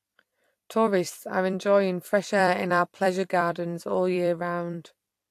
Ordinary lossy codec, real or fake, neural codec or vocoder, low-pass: AAC, 64 kbps; fake; vocoder, 44.1 kHz, 128 mel bands every 256 samples, BigVGAN v2; 14.4 kHz